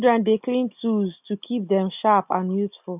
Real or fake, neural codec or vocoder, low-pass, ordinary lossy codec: real; none; 3.6 kHz; none